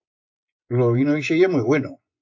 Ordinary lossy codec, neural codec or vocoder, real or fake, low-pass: AAC, 48 kbps; vocoder, 24 kHz, 100 mel bands, Vocos; fake; 7.2 kHz